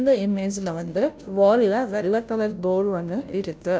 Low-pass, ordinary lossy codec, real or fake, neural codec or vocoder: none; none; fake; codec, 16 kHz, 0.5 kbps, FunCodec, trained on Chinese and English, 25 frames a second